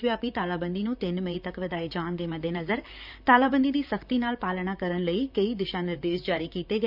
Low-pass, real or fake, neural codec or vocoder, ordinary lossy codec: 5.4 kHz; fake; vocoder, 44.1 kHz, 128 mel bands, Pupu-Vocoder; none